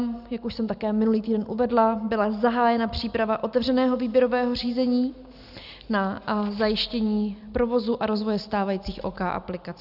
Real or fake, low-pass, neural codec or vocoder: real; 5.4 kHz; none